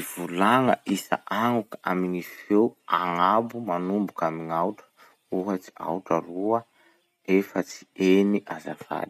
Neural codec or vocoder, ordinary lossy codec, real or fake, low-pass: none; none; real; 14.4 kHz